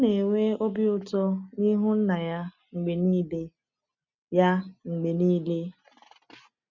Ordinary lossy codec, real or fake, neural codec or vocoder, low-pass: none; real; none; 7.2 kHz